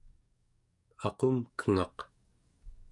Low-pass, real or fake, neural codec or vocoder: 10.8 kHz; fake; codec, 44.1 kHz, 7.8 kbps, DAC